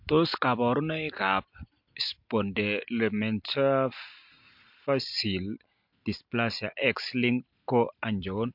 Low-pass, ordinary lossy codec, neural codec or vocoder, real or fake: 5.4 kHz; MP3, 48 kbps; none; real